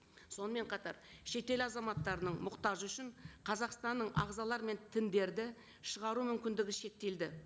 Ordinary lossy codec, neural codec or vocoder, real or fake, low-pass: none; none; real; none